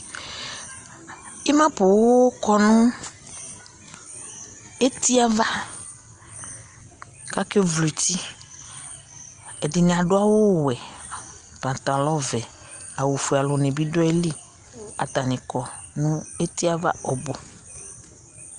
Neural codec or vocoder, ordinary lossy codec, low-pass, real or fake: none; Opus, 32 kbps; 9.9 kHz; real